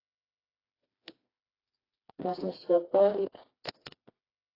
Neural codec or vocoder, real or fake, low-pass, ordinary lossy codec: codec, 16 kHz, 2 kbps, FreqCodec, smaller model; fake; 5.4 kHz; AAC, 24 kbps